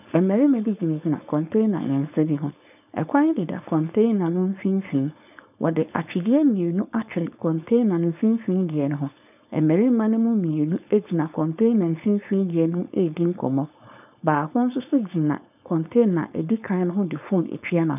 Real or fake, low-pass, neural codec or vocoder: fake; 3.6 kHz; codec, 16 kHz, 4.8 kbps, FACodec